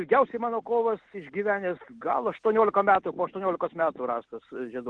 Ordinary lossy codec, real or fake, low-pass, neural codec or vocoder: Opus, 16 kbps; real; 9.9 kHz; none